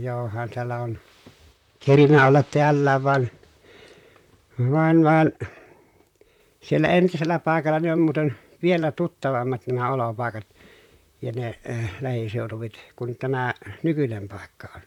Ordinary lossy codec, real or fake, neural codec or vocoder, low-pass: none; fake; vocoder, 44.1 kHz, 128 mel bands, Pupu-Vocoder; 19.8 kHz